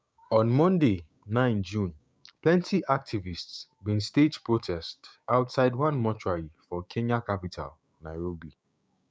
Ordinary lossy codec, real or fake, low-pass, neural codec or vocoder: none; fake; none; codec, 16 kHz, 6 kbps, DAC